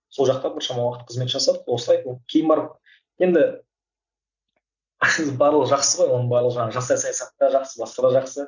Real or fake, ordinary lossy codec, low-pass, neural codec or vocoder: real; none; 7.2 kHz; none